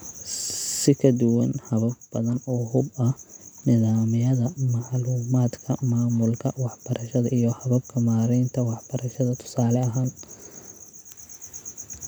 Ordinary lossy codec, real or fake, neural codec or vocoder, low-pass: none; real; none; none